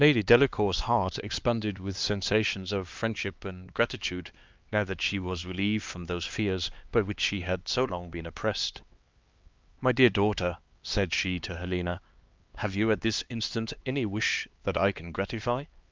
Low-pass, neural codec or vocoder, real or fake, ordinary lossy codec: 7.2 kHz; codec, 16 kHz, 2 kbps, X-Codec, HuBERT features, trained on LibriSpeech; fake; Opus, 24 kbps